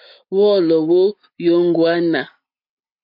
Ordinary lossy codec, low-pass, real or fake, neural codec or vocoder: MP3, 48 kbps; 5.4 kHz; real; none